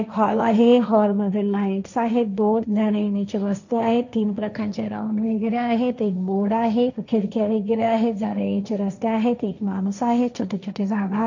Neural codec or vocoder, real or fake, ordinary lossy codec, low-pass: codec, 16 kHz, 1.1 kbps, Voila-Tokenizer; fake; none; 7.2 kHz